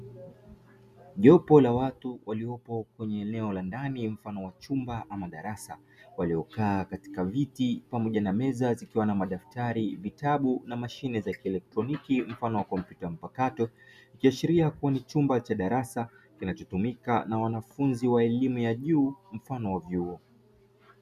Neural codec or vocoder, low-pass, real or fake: none; 14.4 kHz; real